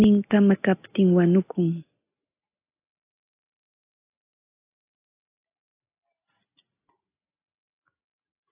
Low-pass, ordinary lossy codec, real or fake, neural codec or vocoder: 3.6 kHz; AAC, 24 kbps; real; none